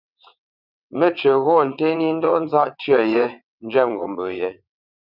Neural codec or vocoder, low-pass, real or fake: vocoder, 22.05 kHz, 80 mel bands, WaveNeXt; 5.4 kHz; fake